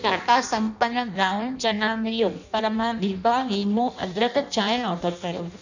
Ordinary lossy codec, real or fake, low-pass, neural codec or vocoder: none; fake; 7.2 kHz; codec, 16 kHz in and 24 kHz out, 0.6 kbps, FireRedTTS-2 codec